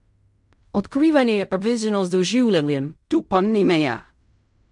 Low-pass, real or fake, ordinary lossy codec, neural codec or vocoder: 10.8 kHz; fake; MP3, 64 kbps; codec, 16 kHz in and 24 kHz out, 0.4 kbps, LongCat-Audio-Codec, fine tuned four codebook decoder